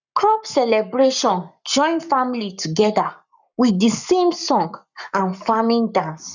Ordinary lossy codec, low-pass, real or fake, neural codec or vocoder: none; 7.2 kHz; fake; codec, 44.1 kHz, 7.8 kbps, Pupu-Codec